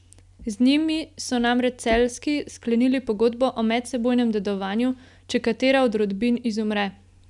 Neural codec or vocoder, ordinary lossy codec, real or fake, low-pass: none; none; real; 10.8 kHz